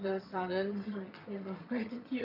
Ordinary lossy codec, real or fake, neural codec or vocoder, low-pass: none; fake; vocoder, 22.05 kHz, 80 mel bands, HiFi-GAN; 5.4 kHz